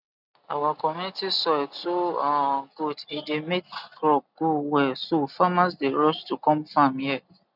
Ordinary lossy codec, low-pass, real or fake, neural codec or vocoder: none; 5.4 kHz; real; none